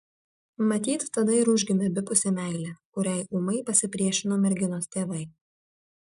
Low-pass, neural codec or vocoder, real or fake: 10.8 kHz; none; real